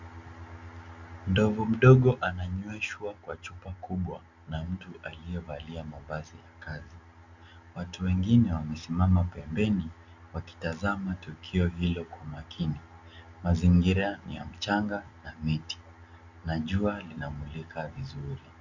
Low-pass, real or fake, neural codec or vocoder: 7.2 kHz; real; none